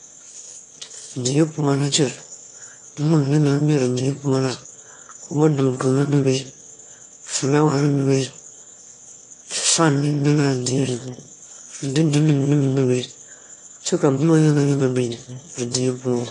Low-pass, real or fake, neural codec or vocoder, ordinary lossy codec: 9.9 kHz; fake; autoencoder, 22.05 kHz, a latent of 192 numbers a frame, VITS, trained on one speaker; AAC, 48 kbps